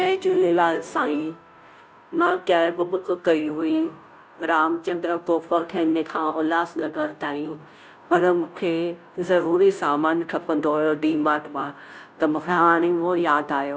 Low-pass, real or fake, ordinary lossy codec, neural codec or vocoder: none; fake; none; codec, 16 kHz, 0.5 kbps, FunCodec, trained on Chinese and English, 25 frames a second